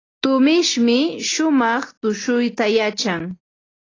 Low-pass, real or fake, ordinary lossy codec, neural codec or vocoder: 7.2 kHz; real; AAC, 32 kbps; none